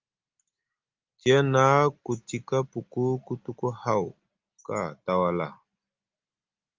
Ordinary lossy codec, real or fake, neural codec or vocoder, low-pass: Opus, 24 kbps; real; none; 7.2 kHz